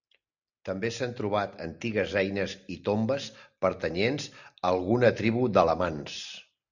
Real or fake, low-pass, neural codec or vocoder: real; 7.2 kHz; none